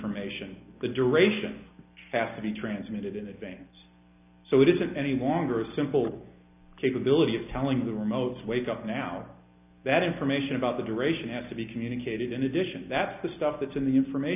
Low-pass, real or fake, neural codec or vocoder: 3.6 kHz; real; none